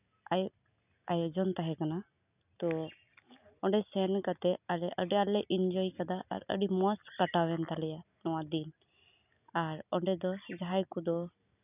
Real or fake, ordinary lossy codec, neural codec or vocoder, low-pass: real; none; none; 3.6 kHz